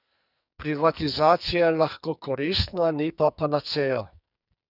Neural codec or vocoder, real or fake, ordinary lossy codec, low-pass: codec, 44.1 kHz, 2.6 kbps, SNAC; fake; none; 5.4 kHz